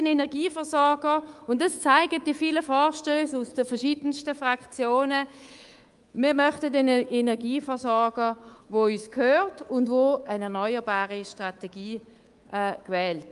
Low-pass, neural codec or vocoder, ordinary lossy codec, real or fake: 10.8 kHz; codec, 24 kHz, 3.1 kbps, DualCodec; Opus, 32 kbps; fake